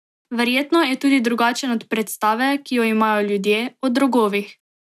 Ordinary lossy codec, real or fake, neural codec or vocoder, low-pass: none; real; none; 14.4 kHz